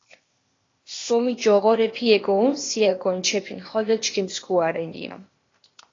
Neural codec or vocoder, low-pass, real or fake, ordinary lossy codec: codec, 16 kHz, 0.8 kbps, ZipCodec; 7.2 kHz; fake; AAC, 32 kbps